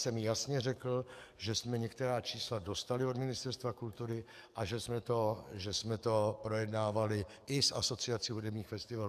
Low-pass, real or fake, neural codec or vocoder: 14.4 kHz; fake; codec, 44.1 kHz, 7.8 kbps, DAC